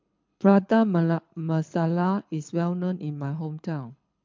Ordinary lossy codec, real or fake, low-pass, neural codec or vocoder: MP3, 64 kbps; fake; 7.2 kHz; codec, 24 kHz, 6 kbps, HILCodec